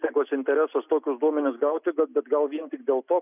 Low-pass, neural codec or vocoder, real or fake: 3.6 kHz; none; real